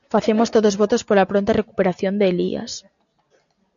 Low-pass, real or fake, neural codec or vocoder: 7.2 kHz; real; none